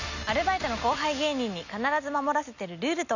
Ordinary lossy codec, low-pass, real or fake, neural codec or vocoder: none; 7.2 kHz; real; none